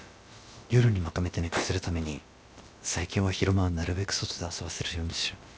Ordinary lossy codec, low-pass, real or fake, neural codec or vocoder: none; none; fake; codec, 16 kHz, about 1 kbps, DyCAST, with the encoder's durations